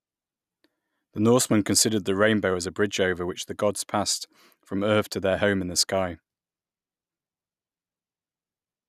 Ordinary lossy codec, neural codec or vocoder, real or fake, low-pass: none; none; real; 14.4 kHz